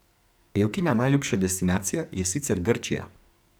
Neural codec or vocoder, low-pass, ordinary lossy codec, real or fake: codec, 44.1 kHz, 2.6 kbps, SNAC; none; none; fake